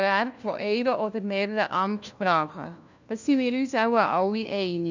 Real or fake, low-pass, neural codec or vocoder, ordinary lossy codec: fake; 7.2 kHz; codec, 16 kHz, 1 kbps, FunCodec, trained on LibriTTS, 50 frames a second; none